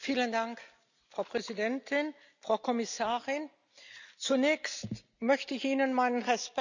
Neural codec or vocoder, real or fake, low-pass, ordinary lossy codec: none; real; 7.2 kHz; none